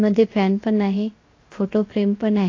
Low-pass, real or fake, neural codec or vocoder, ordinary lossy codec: 7.2 kHz; fake; codec, 16 kHz, 0.7 kbps, FocalCodec; AAC, 32 kbps